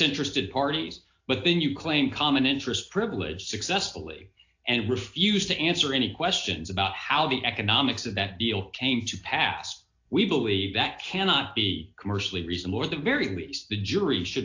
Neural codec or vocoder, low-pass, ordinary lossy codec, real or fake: none; 7.2 kHz; AAC, 48 kbps; real